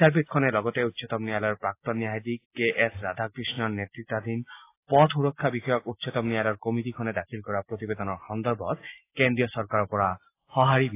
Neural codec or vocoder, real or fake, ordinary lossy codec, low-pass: none; real; AAC, 24 kbps; 3.6 kHz